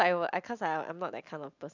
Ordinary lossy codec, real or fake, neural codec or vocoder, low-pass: none; real; none; 7.2 kHz